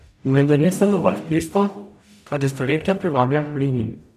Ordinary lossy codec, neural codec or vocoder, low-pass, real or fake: none; codec, 44.1 kHz, 0.9 kbps, DAC; 14.4 kHz; fake